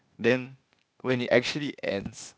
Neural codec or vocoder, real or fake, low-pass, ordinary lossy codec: codec, 16 kHz, 0.8 kbps, ZipCodec; fake; none; none